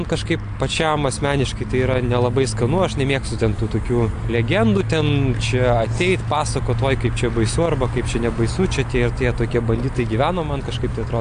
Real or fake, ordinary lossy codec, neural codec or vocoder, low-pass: real; AAC, 64 kbps; none; 9.9 kHz